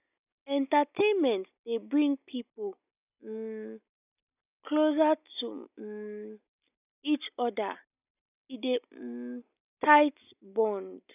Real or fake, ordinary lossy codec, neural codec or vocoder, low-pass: real; none; none; 3.6 kHz